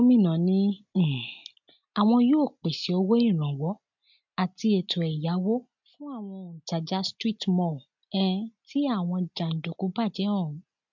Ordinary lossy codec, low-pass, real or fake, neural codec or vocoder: MP3, 64 kbps; 7.2 kHz; real; none